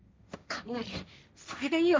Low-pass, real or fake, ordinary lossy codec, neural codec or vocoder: none; fake; none; codec, 16 kHz, 1.1 kbps, Voila-Tokenizer